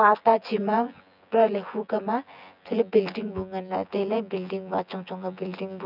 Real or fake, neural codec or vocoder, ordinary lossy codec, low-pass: fake; vocoder, 24 kHz, 100 mel bands, Vocos; none; 5.4 kHz